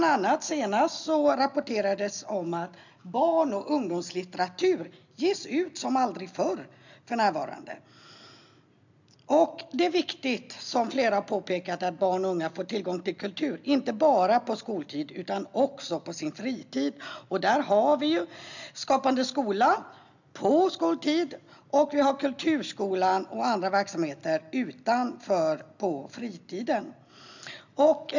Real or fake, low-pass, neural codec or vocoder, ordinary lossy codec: real; 7.2 kHz; none; none